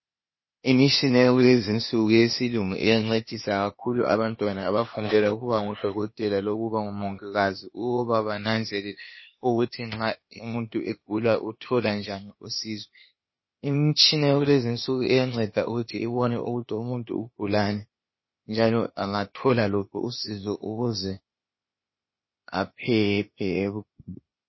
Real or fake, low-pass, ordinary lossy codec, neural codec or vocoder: fake; 7.2 kHz; MP3, 24 kbps; codec, 16 kHz, 0.8 kbps, ZipCodec